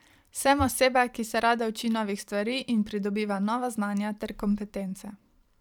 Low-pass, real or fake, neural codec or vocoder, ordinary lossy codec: 19.8 kHz; fake; vocoder, 44.1 kHz, 128 mel bands every 512 samples, BigVGAN v2; none